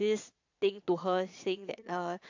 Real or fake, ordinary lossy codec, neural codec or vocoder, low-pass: fake; AAC, 48 kbps; vocoder, 22.05 kHz, 80 mel bands, Vocos; 7.2 kHz